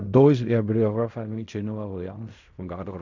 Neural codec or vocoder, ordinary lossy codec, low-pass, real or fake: codec, 16 kHz in and 24 kHz out, 0.4 kbps, LongCat-Audio-Codec, fine tuned four codebook decoder; none; 7.2 kHz; fake